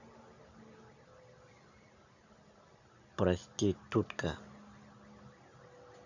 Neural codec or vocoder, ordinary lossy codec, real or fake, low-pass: none; none; real; 7.2 kHz